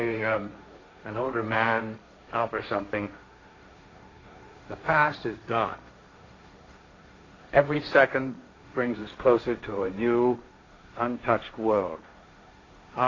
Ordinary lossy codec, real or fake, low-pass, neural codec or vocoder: AAC, 32 kbps; fake; 7.2 kHz; codec, 16 kHz, 1.1 kbps, Voila-Tokenizer